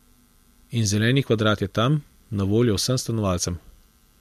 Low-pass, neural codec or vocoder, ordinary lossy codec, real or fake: 14.4 kHz; none; MP3, 64 kbps; real